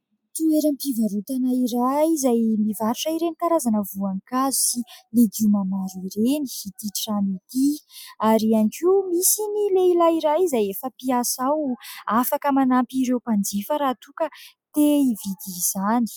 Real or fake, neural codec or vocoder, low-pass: real; none; 19.8 kHz